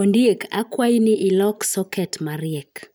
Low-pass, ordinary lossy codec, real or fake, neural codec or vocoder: none; none; fake; vocoder, 44.1 kHz, 128 mel bands every 512 samples, BigVGAN v2